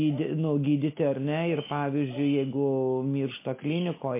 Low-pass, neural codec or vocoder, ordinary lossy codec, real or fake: 3.6 kHz; none; MP3, 24 kbps; real